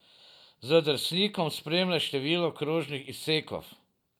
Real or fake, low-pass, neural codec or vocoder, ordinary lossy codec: real; 19.8 kHz; none; none